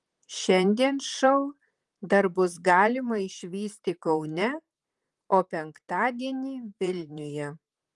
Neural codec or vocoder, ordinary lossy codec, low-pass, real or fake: vocoder, 44.1 kHz, 128 mel bands, Pupu-Vocoder; Opus, 32 kbps; 10.8 kHz; fake